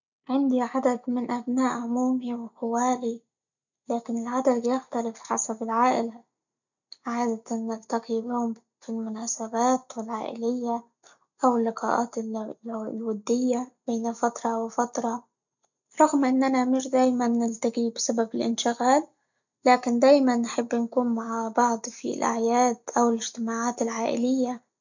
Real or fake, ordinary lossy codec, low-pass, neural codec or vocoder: real; none; 7.2 kHz; none